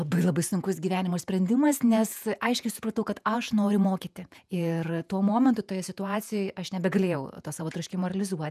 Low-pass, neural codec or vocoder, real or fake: 14.4 kHz; vocoder, 48 kHz, 128 mel bands, Vocos; fake